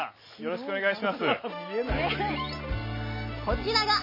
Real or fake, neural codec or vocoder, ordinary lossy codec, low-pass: real; none; MP3, 24 kbps; 5.4 kHz